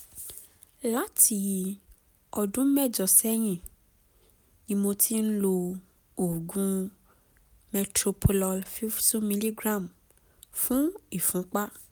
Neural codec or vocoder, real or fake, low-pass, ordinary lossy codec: none; real; none; none